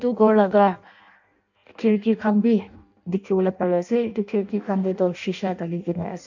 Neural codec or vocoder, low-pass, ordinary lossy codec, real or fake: codec, 16 kHz in and 24 kHz out, 0.6 kbps, FireRedTTS-2 codec; 7.2 kHz; none; fake